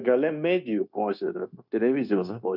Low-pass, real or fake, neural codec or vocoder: 5.4 kHz; fake; codec, 16 kHz, 0.9 kbps, LongCat-Audio-Codec